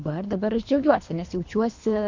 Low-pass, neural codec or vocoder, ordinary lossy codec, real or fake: 7.2 kHz; codec, 24 kHz, 3 kbps, HILCodec; MP3, 48 kbps; fake